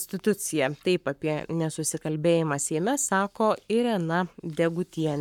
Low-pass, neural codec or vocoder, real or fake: 19.8 kHz; codec, 44.1 kHz, 7.8 kbps, Pupu-Codec; fake